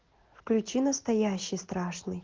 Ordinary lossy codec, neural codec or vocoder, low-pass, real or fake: Opus, 16 kbps; none; 7.2 kHz; real